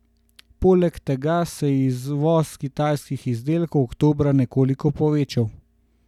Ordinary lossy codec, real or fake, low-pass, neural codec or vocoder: none; real; 19.8 kHz; none